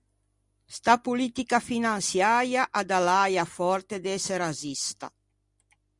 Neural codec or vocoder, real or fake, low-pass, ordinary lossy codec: none; real; 10.8 kHz; Opus, 64 kbps